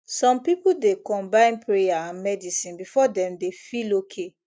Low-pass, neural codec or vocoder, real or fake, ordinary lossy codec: none; none; real; none